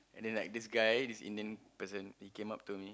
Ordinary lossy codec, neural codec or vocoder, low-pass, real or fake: none; none; none; real